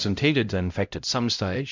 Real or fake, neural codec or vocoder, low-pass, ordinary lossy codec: fake; codec, 16 kHz, 0.5 kbps, X-Codec, HuBERT features, trained on LibriSpeech; 7.2 kHz; MP3, 64 kbps